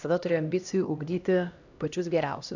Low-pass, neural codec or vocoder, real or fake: 7.2 kHz; codec, 16 kHz, 1 kbps, X-Codec, HuBERT features, trained on LibriSpeech; fake